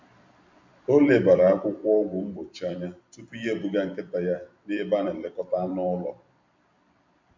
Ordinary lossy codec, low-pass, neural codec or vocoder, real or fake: MP3, 48 kbps; 7.2 kHz; vocoder, 44.1 kHz, 128 mel bands every 256 samples, BigVGAN v2; fake